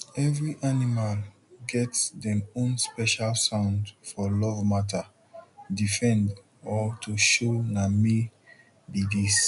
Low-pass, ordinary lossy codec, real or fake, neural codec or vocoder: 10.8 kHz; none; real; none